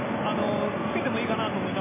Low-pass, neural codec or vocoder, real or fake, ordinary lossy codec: 3.6 kHz; none; real; MP3, 16 kbps